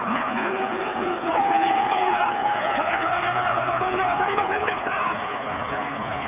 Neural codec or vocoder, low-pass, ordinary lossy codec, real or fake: codec, 16 kHz, 4 kbps, FreqCodec, smaller model; 3.6 kHz; none; fake